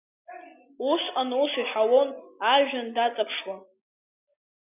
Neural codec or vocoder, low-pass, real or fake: none; 3.6 kHz; real